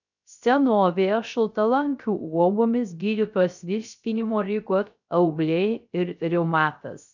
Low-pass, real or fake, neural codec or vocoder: 7.2 kHz; fake; codec, 16 kHz, 0.3 kbps, FocalCodec